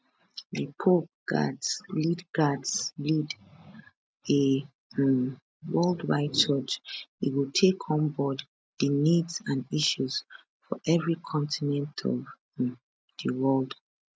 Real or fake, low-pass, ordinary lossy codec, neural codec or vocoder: real; none; none; none